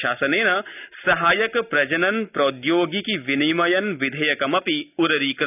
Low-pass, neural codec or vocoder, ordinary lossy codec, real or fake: 3.6 kHz; none; none; real